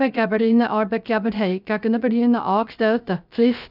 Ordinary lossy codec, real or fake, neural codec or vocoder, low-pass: none; fake; codec, 16 kHz, 0.3 kbps, FocalCodec; 5.4 kHz